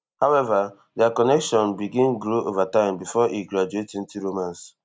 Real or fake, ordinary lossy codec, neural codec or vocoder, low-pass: real; none; none; none